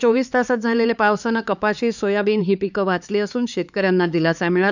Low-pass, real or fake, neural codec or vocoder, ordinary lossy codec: 7.2 kHz; fake; codec, 16 kHz, 4 kbps, X-Codec, HuBERT features, trained on LibriSpeech; none